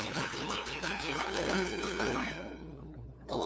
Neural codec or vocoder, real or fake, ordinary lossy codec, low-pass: codec, 16 kHz, 2 kbps, FunCodec, trained on LibriTTS, 25 frames a second; fake; none; none